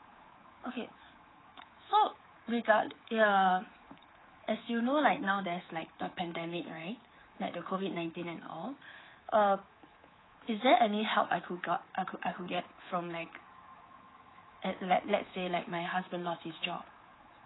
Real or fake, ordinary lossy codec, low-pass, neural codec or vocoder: fake; AAC, 16 kbps; 7.2 kHz; codec, 24 kHz, 3.1 kbps, DualCodec